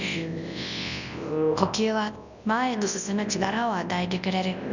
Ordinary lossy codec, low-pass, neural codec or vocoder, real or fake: none; 7.2 kHz; codec, 24 kHz, 0.9 kbps, WavTokenizer, large speech release; fake